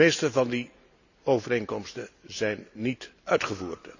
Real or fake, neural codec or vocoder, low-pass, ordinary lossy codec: real; none; 7.2 kHz; none